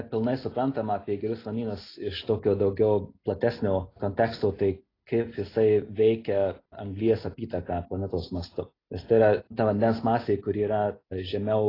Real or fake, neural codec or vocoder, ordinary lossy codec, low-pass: real; none; AAC, 24 kbps; 5.4 kHz